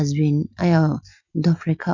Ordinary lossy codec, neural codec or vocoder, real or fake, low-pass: none; none; real; 7.2 kHz